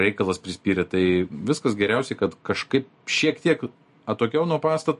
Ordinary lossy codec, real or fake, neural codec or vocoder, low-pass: MP3, 48 kbps; real; none; 10.8 kHz